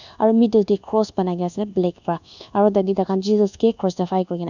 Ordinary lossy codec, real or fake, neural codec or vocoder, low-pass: none; fake; codec, 24 kHz, 1.2 kbps, DualCodec; 7.2 kHz